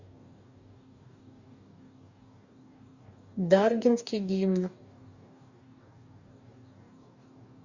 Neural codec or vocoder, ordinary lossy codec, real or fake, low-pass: codec, 44.1 kHz, 2.6 kbps, DAC; Opus, 64 kbps; fake; 7.2 kHz